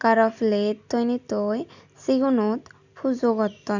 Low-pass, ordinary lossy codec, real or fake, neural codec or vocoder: 7.2 kHz; none; real; none